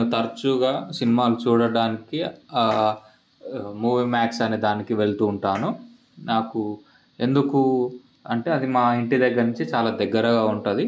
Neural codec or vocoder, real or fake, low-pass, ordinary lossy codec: none; real; none; none